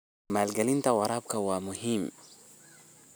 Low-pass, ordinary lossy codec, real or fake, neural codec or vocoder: none; none; real; none